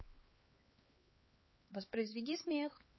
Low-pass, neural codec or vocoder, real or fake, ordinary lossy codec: 7.2 kHz; codec, 16 kHz, 4 kbps, X-Codec, HuBERT features, trained on LibriSpeech; fake; MP3, 24 kbps